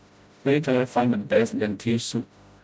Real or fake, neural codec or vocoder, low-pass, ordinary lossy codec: fake; codec, 16 kHz, 0.5 kbps, FreqCodec, smaller model; none; none